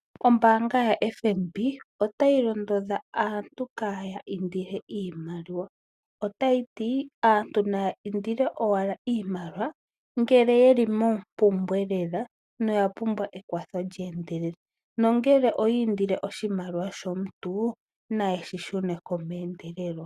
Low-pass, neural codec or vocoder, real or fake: 14.4 kHz; none; real